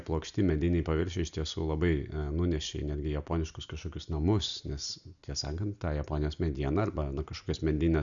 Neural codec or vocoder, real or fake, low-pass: none; real; 7.2 kHz